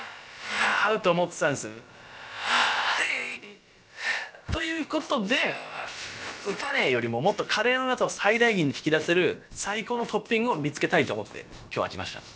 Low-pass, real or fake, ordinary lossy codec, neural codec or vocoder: none; fake; none; codec, 16 kHz, about 1 kbps, DyCAST, with the encoder's durations